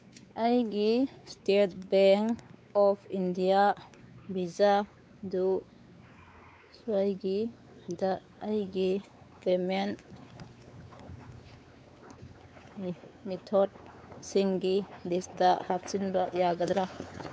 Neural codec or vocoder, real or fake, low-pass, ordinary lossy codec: codec, 16 kHz, 4 kbps, X-Codec, WavLM features, trained on Multilingual LibriSpeech; fake; none; none